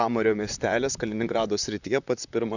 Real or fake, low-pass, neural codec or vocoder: fake; 7.2 kHz; vocoder, 44.1 kHz, 128 mel bands, Pupu-Vocoder